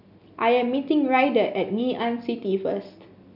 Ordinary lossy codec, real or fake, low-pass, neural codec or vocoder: none; real; 5.4 kHz; none